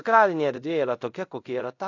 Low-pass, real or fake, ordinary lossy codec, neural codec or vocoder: 7.2 kHz; fake; AAC, 48 kbps; codec, 24 kHz, 0.5 kbps, DualCodec